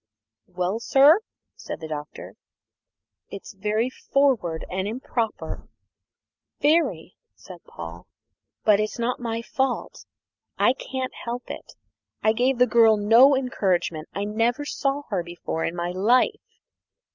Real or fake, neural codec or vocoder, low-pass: real; none; 7.2 kHz